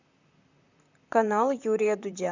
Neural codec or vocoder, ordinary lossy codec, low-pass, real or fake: vocoder, 44.1 kHz, 128 mel bands, Pupu-Vocoder; Opus, 64 kbps; 7.2 kHz; fake